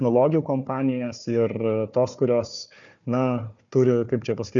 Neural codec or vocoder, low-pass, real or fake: codec, 16 kHz, 4 kbps, FunCodec, trained on Chinese and English, 50 frames a second; 7.2 kHz; fake